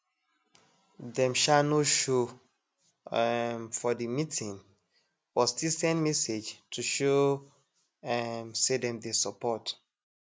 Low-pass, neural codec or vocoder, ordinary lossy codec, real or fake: none; none; none; real